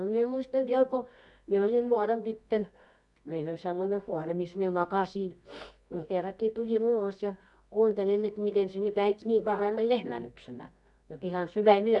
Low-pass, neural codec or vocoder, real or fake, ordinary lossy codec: 10.8 kHz; codec, 24 kHz, 0.9 kbps, WavTokenizer, medium music audio release; fake; none